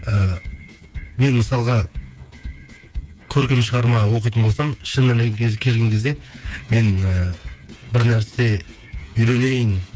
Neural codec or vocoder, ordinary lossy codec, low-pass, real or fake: codec, 16 kHz, 4 kbps, FreqCodec, smaller model; none; none; fake